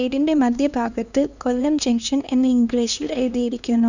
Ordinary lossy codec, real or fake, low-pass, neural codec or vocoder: none; fake; 7.2 kHz; codec, 16 kHz, 2 kbps, X-Codec, HuBERT features, trained on LibriSpeech